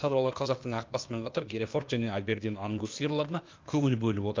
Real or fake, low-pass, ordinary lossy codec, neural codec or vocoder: fake; 7.2 kHz; Opus, 24 kbps; codec, 16 kHz, 0.8 kbps, ZipCodec